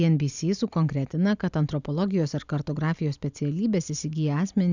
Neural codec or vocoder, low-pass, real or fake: none; 7.2 kHz; real